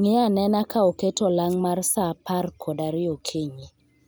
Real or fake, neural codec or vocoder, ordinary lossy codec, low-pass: real; none; none; none